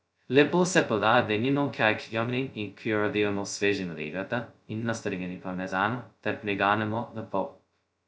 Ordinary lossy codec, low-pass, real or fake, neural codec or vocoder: none; none; fake; codec, 16 kHz, 0.2 kbps, FocalCodec